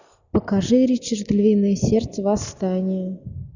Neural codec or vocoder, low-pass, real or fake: none; 7.2 kHz; real